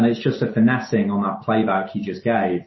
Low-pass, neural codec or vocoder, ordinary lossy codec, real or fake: 7.2 kHz; none; MP3, 24 kbps; real